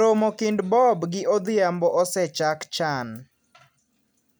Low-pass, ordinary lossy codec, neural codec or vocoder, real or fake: none; none; none; real